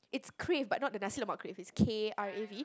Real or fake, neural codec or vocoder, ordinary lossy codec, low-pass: real; none; none; none